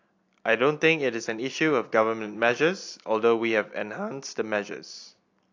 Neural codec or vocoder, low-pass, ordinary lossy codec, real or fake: none; 7.2 kHz; AAC, 48 kbps; real